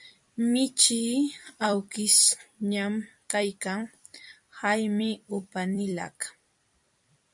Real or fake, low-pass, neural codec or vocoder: fake; 10.8 kHz; vocoder, 44.1 kHz, 128 mel bands every 256 samples, BigVGAN v2